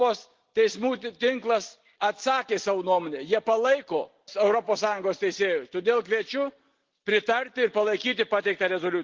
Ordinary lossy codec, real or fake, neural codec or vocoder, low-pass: Opus, 16 kbps; real; none; 7.2 kHz